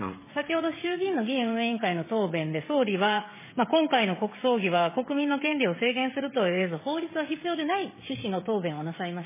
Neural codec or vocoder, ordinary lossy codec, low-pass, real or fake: codec, 16 kHz, 16 kbps, FreqCodec, smaller model; MP3, 16 kbps; 3.6 kHz; fake